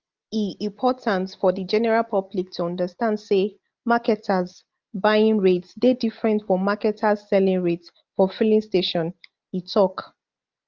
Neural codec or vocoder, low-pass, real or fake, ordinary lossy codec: none; 7.2 kHz; real; Opus, 24 kbps